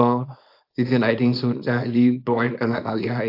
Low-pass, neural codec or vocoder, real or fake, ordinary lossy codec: 5.4 kHz; codec, 24 kHz, 0.9 kbps, WavTokenizer, small release; fake; AAC, 32 kbps